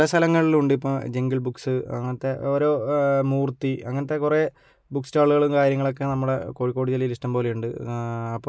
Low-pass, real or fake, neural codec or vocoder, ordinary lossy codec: none; real; none; none